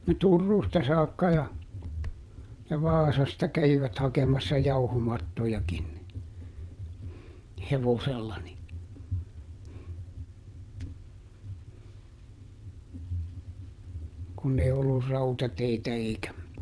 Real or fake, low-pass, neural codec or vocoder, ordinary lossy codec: fake; none; vocoder, 22.05 kHz, 80 mel bands, WaveNeXt; none